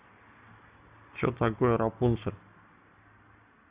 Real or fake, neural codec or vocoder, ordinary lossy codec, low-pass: real; none; Opus, 64 kbps; 3.6 kHz